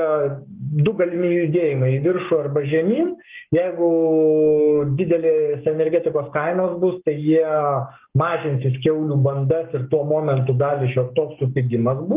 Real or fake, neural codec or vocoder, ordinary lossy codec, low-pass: fake; codec, 44.1 kHz, 7.8 kbps, Pupu-Codec; Opus, 32 kbps; 3.6 kHz